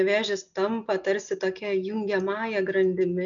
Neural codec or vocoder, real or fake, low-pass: none; real; 7.2 kHz